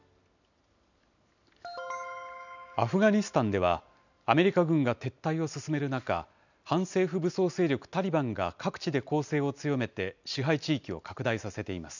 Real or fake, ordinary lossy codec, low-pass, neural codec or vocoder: real; none; 7.2 kHz; none